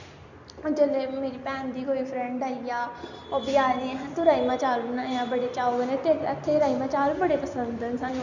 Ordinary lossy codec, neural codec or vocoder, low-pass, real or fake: none; none; 7.2 kHz; real